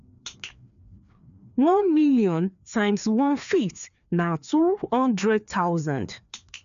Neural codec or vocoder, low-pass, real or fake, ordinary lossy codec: codec, 16 kHz, 2 kbps, FreqCodec, larger model; 7.2 kHz; fake; none